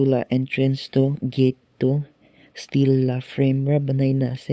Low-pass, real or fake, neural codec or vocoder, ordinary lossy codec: none; fake; codec, 16 kHz, 8 kbps, FunCodec, trained on LibriTTS, 25 frames a second; none